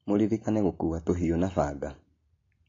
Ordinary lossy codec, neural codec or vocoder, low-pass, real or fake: AAC, 32 kbps; none; 7.2 kHz; real